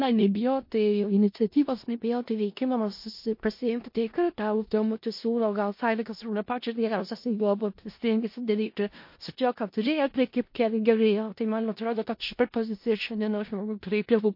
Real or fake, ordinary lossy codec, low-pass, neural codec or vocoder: fake; MP3, 32 kbps; 5.4 kHz; codec, 16 kHz in and 24 kHz out, 0.4 kbps, LongCat-Audio-Codec, four codebook decoder